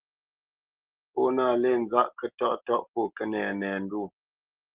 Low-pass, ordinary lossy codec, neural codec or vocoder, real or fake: 3.6 kHz; Opus, 16 kbps; none; real